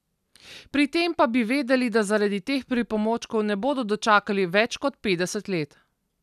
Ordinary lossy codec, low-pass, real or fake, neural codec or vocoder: none; 14.4 kHz; real; none